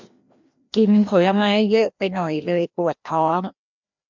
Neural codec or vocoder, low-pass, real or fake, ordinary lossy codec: codec, 16 kHz, 1 kbps, FreqCodec, larger model; 7.2 kHz; fake; MP3, 64 kbps